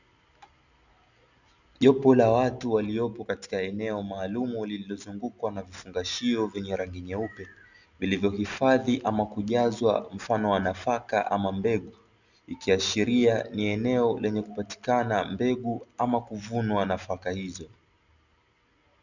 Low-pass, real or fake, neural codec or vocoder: 7.2 kHz; real; none